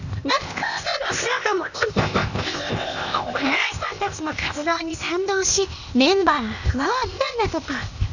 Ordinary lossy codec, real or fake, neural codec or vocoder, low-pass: none; fake; codec, 16 kHz, 0.8 kbps, ZipCodec; 7.2 kHz